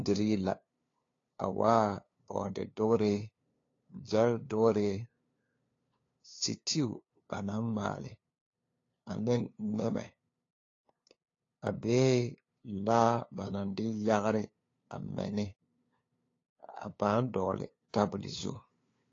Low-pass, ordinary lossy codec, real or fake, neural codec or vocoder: 7.2 kHz; AAC, 32 kbps; fake; codec, 16 kHz, 2 kbps, FunCodec, trained on LibriTTS, 25 frames a second